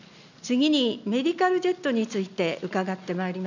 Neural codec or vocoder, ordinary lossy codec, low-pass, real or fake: none; none; 7.2 kHz; real